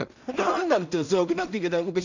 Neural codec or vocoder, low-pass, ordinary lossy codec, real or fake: codec, 16 kHz in and 24 kHz out, 0.4 kbps, LongCat-Audio-Codec, two codebook decoder; 7.2 kHz; none; fake